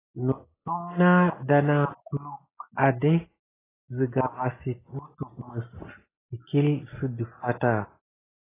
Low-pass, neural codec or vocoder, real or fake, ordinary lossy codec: 3.6 kHz; none; real; AAC, 16 kbps